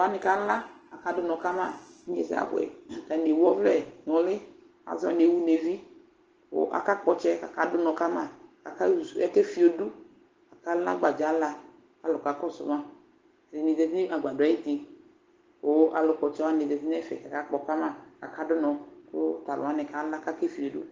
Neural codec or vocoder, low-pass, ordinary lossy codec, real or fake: none; 7.2 kHz; Opus, 16 kbps; real